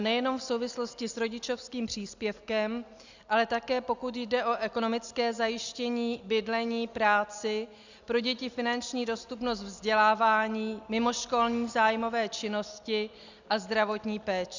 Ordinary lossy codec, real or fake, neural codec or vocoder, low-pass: Opus, 64 kbps; real; none; 7.2 kHz